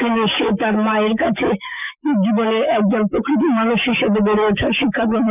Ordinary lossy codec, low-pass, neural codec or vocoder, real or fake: none; 3.6 kHz; autoencoder, 48 kHz, 128 numbers a frame, DAC-VAE, trained on Japanese speech; fake